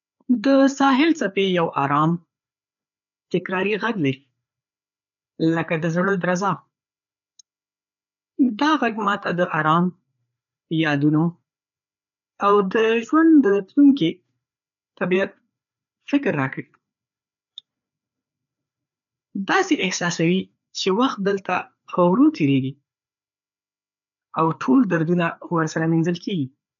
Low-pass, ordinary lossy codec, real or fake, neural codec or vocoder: 7.2 kHz; none; fake; codec, 16 kHz, 4 kbps, FreqCodec, larger model